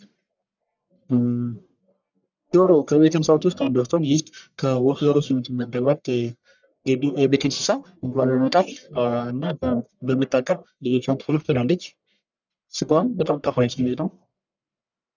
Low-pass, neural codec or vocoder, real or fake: 7.2 kHz; codec, 44.1 kHz, 1.7 kbps, Pupu-Codec; fake